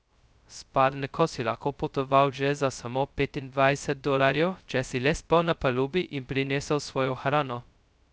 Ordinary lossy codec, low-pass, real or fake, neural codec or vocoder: none; none; fake; codec, 16 kHz, 0.2 kbps, FocalCodec